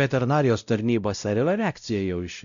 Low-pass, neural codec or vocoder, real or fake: 7.2 kHz; codec, 16 kHz, 0.5 kbps, X-Codec, WavLM features, trained on Multilingual LibriSpeech; fake